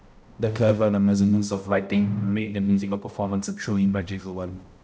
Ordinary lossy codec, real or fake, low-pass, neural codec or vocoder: none; fake; none; codec, 16 kHz, 0.5 kbps, X-Codec, HuBERT features, trained on balanced general audio